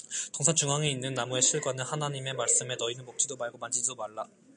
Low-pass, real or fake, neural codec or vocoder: 9.9 kHz; real; none